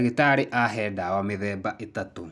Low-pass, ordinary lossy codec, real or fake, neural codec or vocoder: none; none; real; none